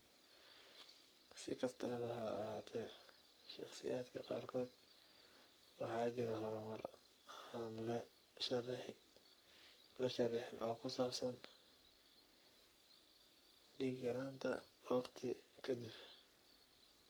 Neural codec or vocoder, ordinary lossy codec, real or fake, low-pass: codec, 44.1 kHz, 3.4 kbps, Pupu-Codec; none; fake; none